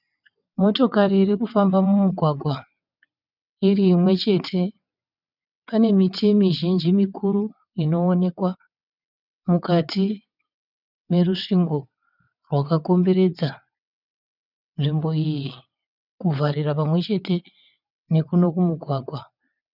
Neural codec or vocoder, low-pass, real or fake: vocoder, 22.05 kHz, 80 mel bands, WaveNeXt; 5.4 kHz; fake